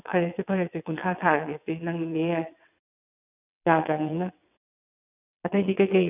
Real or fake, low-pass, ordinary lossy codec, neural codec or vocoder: fake; 3.6 kHz; none; vocoder, 22.05 kHz, 80 mel bands, WaveNeXt